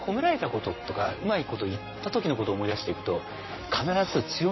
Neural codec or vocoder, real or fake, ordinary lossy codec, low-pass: vocoder, 44.1 kHz, 128 mel bands, Pupu-Vocoder; fake; MP3, 24 kbps; 7.2 kHz